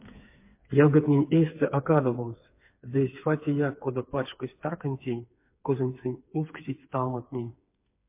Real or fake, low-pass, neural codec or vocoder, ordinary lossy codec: fake; 3.6 kHz; codec, 16 kHz, 4 kbps, FreqCodec, smaller model; MP3, 24 kbps